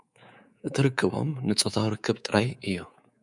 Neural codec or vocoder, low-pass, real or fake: codec, 24 kHz, 3.1 kbps, DualCodec; 10.8 kHz; fake